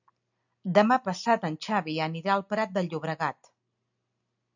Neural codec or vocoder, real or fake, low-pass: none; real; 7.2 kHz